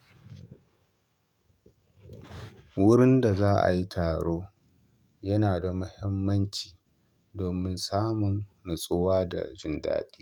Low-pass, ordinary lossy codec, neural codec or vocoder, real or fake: 19.8 kHz; none; codec, 44.1 kHz, 7.8 kbps, DAC; fake